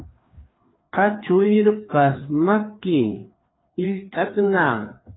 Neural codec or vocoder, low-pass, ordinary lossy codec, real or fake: codec, 16 kHz, 2 kbps, FreqCodec, larger model; 7.2 kHz; AAC, 16 kbps; fake